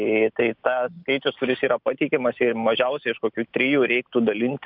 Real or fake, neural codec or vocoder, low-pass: real; none; 5.4 kHz